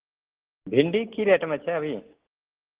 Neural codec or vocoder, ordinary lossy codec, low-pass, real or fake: none; Opus, 16 kbps; 3.6 kHz; real